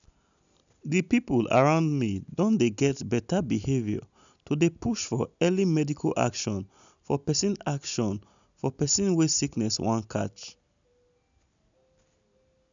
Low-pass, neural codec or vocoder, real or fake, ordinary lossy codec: 7.2 kHz; none; real; none